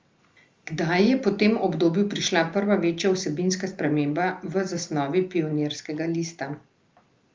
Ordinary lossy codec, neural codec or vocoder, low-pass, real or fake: Opus, 32 kbps; none; 7.2 kHz; real